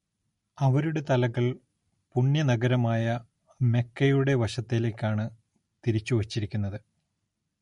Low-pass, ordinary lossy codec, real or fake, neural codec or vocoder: 19.8 kHz; MP3, 48 kbps; real; none